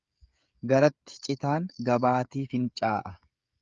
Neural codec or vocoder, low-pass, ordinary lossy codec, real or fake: codec, 16 kHz, 16 kbps, FreqCodec, smaller model; 7.2 kHz; Opus, 24 kbps; fake